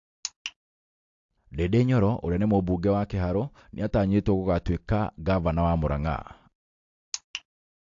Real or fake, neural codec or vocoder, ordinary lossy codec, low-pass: real; none; AAC, 48 kbps; 7.2 kHz